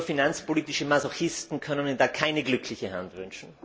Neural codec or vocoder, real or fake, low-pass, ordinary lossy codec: none; real; none; none